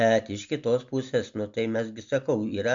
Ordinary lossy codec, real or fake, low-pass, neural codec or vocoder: MP3, 64 kbps; real; 7.2 kHz; none